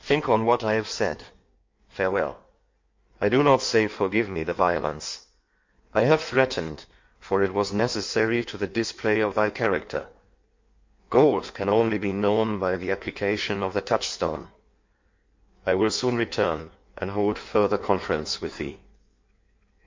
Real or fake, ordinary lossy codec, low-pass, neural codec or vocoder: fake; MP3, 48 kbps; 7.2 kHz; codec, 16 kHz in and 24 kHz out, 1.1 kbps, FireRedTTS-2 codec